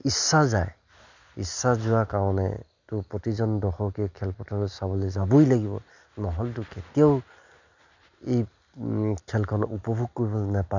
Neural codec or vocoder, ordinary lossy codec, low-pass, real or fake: none; none; 7.2 kHz; real